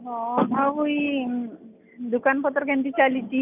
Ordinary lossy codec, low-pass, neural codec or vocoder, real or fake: none; 3.6 kHz; none; real